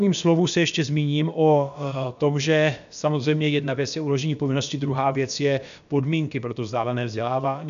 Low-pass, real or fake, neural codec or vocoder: 7.2 kHz; fake; codec, 16 kHz, about 1 kbps, DyCAST, with the encoder's durations